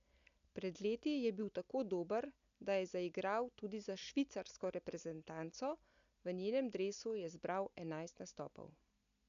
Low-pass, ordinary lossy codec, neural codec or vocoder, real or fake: 7.2 kHz; none; none; real